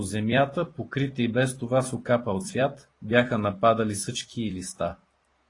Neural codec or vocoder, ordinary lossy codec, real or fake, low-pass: vocoder, 44.1 kHz, 128 mel bands every 256 samples, BigVGAN v2; AAC, 32 kbps; fake; 10.8 kHz